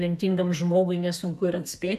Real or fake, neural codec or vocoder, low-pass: fake; codec, 32 kHz, 1.9 kbps, SNAC; 14.4 kHz